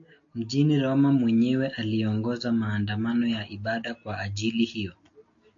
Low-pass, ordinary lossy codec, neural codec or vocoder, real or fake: 7.2 kHz; AAC, 48 kbps; none; real